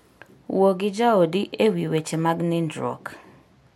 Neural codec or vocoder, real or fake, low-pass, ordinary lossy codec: none; real; 19.8 kHz; MP3, 64 kbps